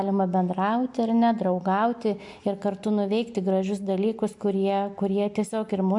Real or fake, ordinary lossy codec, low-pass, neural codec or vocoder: real; MP3, 64 kbps; 10.8 kHz; none